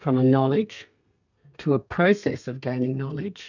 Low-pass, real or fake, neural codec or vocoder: 7.2 kHz; fake; codec, 32 kHz, 1.9 kbps, SNAC